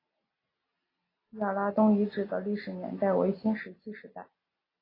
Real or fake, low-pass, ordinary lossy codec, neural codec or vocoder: real; 5.4 kHz; AAC, 24 kbps; none